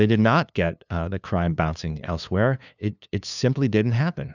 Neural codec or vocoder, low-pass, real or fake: codec, 16 kHz, 2 kbps, FunCodec, trained on LibriTTS, 25 frames a second; 7.2 kHz; fake